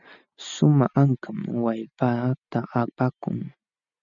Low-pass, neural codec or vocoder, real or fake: 7.2 kHz; none; real